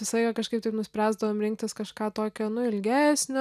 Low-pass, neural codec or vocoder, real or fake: 14.4 kHz; none; real